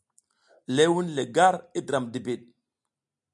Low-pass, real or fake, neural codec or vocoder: 10.8 kHz; real; none